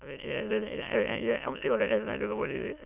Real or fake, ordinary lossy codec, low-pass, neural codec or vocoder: fake; none; 3.6 kHz; autoencoder, 22.05 kHz, a latent of 192 numbers a frame, VITS, trained on many speakers